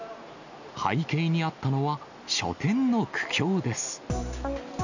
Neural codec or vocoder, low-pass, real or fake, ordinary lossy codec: none; 7.2 kHz; real; none